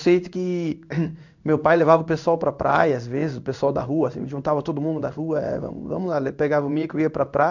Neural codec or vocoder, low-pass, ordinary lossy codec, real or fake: codec, 16 kHz in and 24 kHz out, 1 kbps, XY-Tokenizer; 7.2 kHz; none; fake